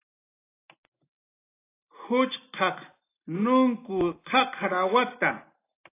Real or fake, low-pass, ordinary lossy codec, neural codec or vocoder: real; 3.6 kHz; AAC, 24 kbps; none